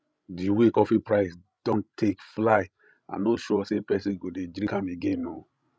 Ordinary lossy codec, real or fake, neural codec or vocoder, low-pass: none; fake; codec, 16 kHz, 16 kbps, FreqCodec, larger model; none